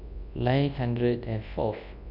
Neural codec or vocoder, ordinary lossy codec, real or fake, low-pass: codec, 24 kHz, 0.9 kbps, WavTokenizer, large speech release; none; fake; 5.4 kHz